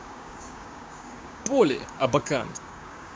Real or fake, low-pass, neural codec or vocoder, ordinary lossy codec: fake; none; codec, 16 kHz, 6 kbps, DAC; none